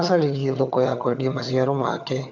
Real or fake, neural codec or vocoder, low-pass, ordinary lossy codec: fake; vocoder, 22.05 kHz, 80 mel bands, HiFi-GAN; 7.2 kHz; none